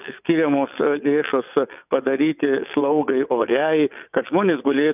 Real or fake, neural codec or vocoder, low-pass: fake; vocoder, 22.05 kHz, 80 mel bands, WaveNeXt; 3.6 kHz